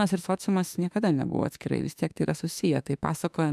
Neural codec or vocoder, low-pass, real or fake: autoencoder, 48 kHz, 32 numbers a frame, DAC-VAE, trained on Japanese speech; 14.4 kHz; fake